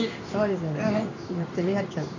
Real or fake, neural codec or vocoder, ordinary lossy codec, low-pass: fake; codec, 16 kHz in and 24 kHz out, 1 kbps, XY-Tokenizer; none; 7.2 kHz